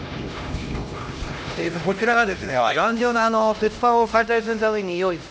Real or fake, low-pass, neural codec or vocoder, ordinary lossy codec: fake; none; codec, 16 kHz, 1 kbps, X-Codec, HuBERT features, trained on LibriSpeech; none